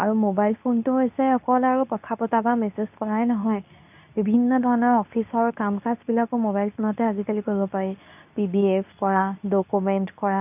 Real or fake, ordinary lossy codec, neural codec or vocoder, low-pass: fake; none; codec, 24 kHz, 0.9 kbps, WavTokenizer, medium speech release version 2; 3.6 kHz